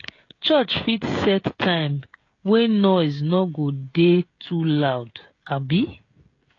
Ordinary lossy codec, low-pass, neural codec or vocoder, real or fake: AAC, 32 kbps; 7.2 kHz; codec, 16 kHz, 16 kbps, FreqCodec, smaller model; fake